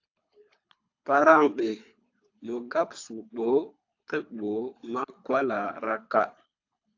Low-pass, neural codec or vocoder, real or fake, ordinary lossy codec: 7.2 kHz; codec, 24 kHz, 3 kbps, HILCodec; fake; AAC, 48 kbps